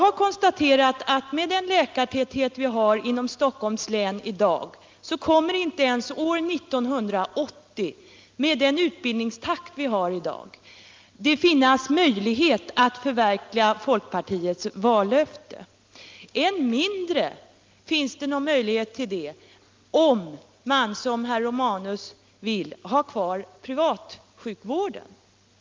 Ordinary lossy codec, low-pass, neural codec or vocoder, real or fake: Opus, 32 kbps; 7.2 kHz; none; real